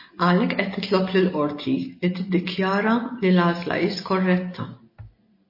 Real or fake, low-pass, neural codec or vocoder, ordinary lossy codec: real; 5.4 kHz; none; MP3, 24 kbps